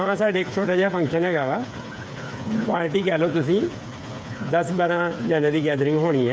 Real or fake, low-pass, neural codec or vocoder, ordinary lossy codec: fake; none; codec, 16 kHz, 8 kbps, FreqCodec, smaller model; none